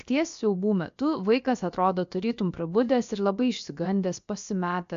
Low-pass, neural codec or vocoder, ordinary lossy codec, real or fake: 7.2 kHz; codec, 16 kHz, about 1 kbps, DyCAST, with the encoder's durations; MP3, 64 kbps; fake